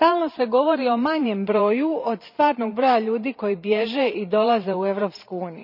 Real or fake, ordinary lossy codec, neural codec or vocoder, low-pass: fake; none; vocoder, 44.1 kHz, 128 mel bands every 512 samples, BigVGAN v2; 5.4 kHz